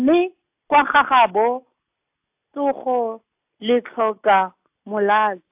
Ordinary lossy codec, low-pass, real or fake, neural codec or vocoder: AAC, 32 kbps; 3.6 kHz; real; none